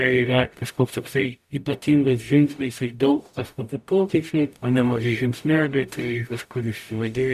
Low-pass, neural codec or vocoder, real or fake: 14.4 kHz; codec, 44.1 kHz, 0.9 kbps, DAC; fake